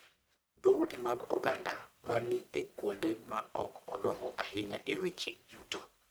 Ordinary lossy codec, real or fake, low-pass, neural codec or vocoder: none; fake; none; codec, 44.1 kHz, 1.7 kbps, Pupu-Codec